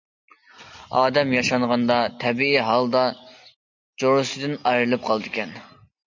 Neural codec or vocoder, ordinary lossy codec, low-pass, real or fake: none; MP3, 64 kbps; 7.2 kHz; real